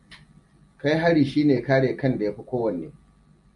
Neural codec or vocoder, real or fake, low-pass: none; real; 10.8 kHz